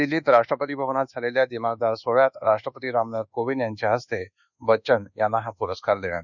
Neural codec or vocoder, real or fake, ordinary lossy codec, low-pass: codec, 24 kHz, 1.2 kbps, DualCodec; fake; none; 7.2 kHz